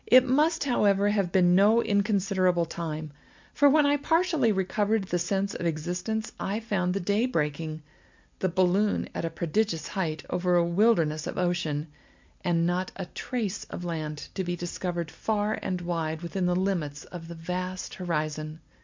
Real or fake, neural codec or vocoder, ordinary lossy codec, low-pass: real; none; MP3, 64 kbps; 7.2 kHz